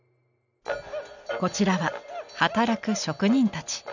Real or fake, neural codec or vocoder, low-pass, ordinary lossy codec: real; none; 7.2 kHz; none